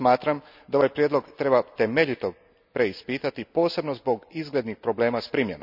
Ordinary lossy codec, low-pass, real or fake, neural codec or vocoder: none; 5.4 kHz; real; none